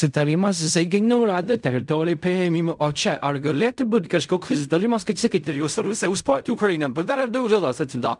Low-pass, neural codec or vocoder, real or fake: 10.8 kHz; codec, 16 kHz in and 24 kHz out, 0.4 kbps, LongCat-Audio-Codec, fine tuned four codebook decoder; fake